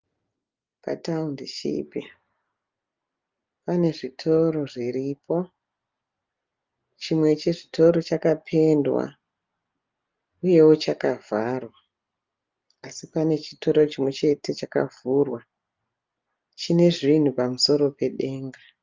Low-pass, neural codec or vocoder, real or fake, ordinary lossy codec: 7.2 kHz; none; real; Opus, 32 kbps